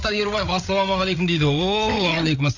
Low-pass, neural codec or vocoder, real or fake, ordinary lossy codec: 7.2 kHz; codec, 16 kHz, 8 kbps, FreqCodec, larger model; fake; none